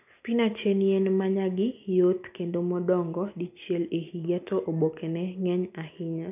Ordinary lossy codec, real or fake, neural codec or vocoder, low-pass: none; real; none; 3.6 kHz